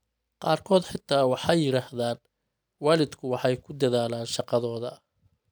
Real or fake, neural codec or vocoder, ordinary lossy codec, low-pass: real; none; none; none